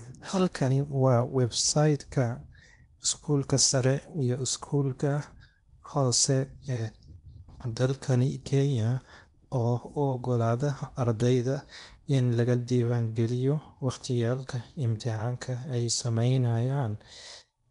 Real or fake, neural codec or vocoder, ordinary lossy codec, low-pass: fake; codec, 16 kHz in and 24 kHz out, 0.8 kbps, FocalCodec, streaming, 65536 codes; none; 10.8 kHz